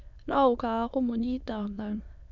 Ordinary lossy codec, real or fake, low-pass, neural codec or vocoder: none; fake; 7.2 kHz; autoencoder, 22.05 kHz, a latent of 192 numbers a frame, VITS, trained on many speakers